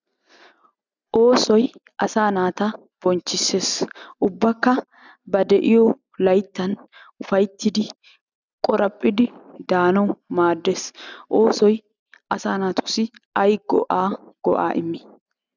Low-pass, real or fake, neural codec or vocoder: 7.2 kHz; real; none